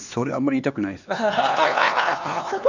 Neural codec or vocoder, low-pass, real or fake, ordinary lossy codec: codec, 16 kHz, 2 kbps, X-Codec, HuBERT features, trained on LibriSpeech; 7.2 kHz; fake; none